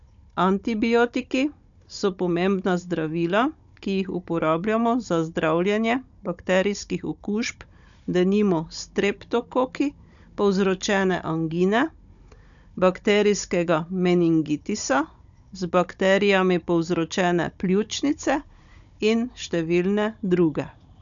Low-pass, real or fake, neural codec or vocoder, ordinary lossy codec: 7.2 kHz; fake; codec, 16 kHz, 16 kbps, FunCodec, trained on Chinese and English, 50 frames a second; none